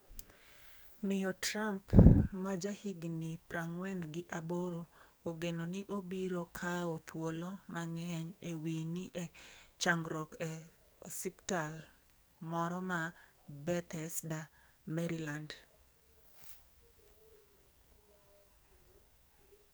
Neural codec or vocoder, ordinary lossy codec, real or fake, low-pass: codec, 44.1 kHz, 2.6 kbps, SNAC; none; fake; none